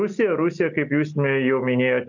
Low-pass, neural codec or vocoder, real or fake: 7.2 kHz; none; real